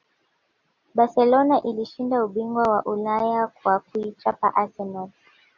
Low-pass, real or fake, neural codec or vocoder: 7.2 kHz; real; none